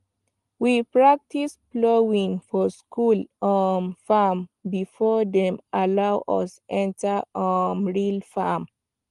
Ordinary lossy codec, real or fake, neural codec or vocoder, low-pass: Opus, 24 kbps; real; none; 10.8 kHz